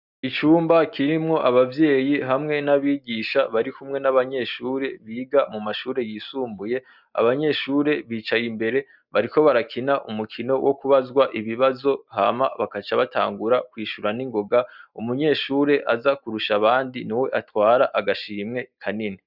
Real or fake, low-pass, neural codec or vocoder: real; 5.4 kHz; none